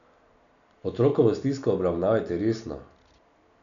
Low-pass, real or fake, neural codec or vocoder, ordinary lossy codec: 7.2 kHz; real; none; none